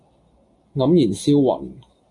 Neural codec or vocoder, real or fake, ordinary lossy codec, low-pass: none; real; AAC, 48 kbps; 10.8 kHz